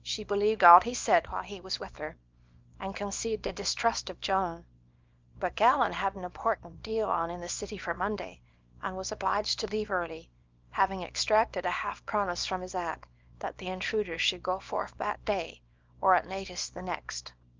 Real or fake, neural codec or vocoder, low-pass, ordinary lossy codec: fake; codec, 24 kHz, 0.9 kbps, WavTokenizer, small release; 7.2 kHz; Opus, 32 kbps